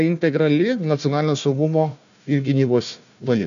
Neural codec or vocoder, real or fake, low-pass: codec, 16 kHz, 1 kbps, FunCodec, trained on Chinese and English, 50 frames a second; fake; 7.2 kHz